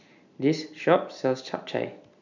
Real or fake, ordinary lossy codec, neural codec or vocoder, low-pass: real; none; none; 7.2 kHz